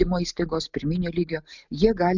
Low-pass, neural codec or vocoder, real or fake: 7.2 kHz; none; real